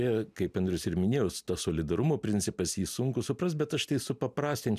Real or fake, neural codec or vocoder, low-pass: real; none; 14.4 kHz